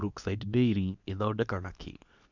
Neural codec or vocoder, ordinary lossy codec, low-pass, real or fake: codec, 24 kHz, 0.9 kbps, WavTokenizer, medium speech release version 2; none; 7.2 kHz; fake